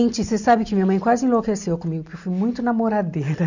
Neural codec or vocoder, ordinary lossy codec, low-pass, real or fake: none; none; 7.2 kHz; real